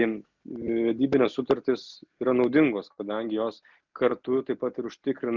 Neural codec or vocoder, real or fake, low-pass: none; real; 7.2 kHz